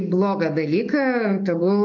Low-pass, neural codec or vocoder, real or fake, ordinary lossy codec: 7.2 kHz; codec, 44.1 kHz, 7.8 kbps, DAC; fake; MP3, 48 kbps